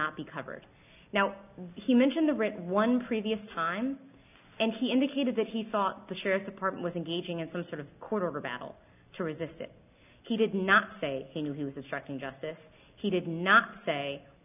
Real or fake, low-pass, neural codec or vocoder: real; 3.6 kHz; none